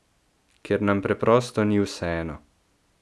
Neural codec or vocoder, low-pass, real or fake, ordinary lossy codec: none; none; real; none